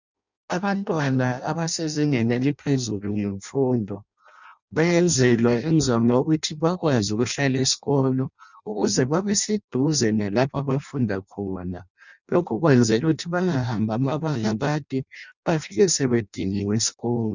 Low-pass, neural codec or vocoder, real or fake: 7.2 kHz; codec, 16 kHz in and 24 kHz out, 0.6 kbps, FireRedTTS-2 codec; fake